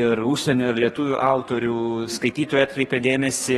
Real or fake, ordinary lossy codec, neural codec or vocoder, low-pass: fake; AAC, 32 kbps; codec, 32 kHz, 1.9 kbps, SNAC; 14.4 kHz